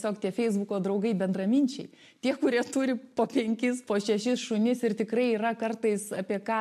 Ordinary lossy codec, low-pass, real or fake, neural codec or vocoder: MP3, 64 kbps; 14.4 kHz; real; none